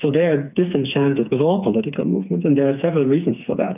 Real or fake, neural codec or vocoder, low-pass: fake; codec, 16 kHz, 4 kbps, FreqCodec, smaller model; 3.6 kHz